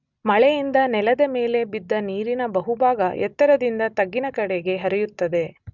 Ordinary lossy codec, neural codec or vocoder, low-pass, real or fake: none; none; 7.2 kHz; real